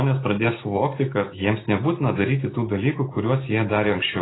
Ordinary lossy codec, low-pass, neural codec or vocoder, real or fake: AAC, 16 kbps; 7.2 kHz; none; real